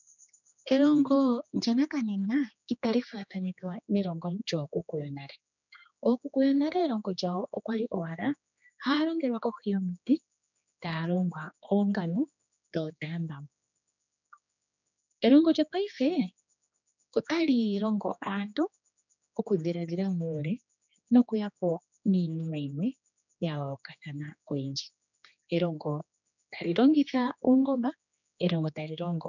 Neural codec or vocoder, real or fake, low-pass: codec, 16 kHz, 2 kbps, X-Codec, HuBERT features, trained on general audio; fake; 7.2 kHz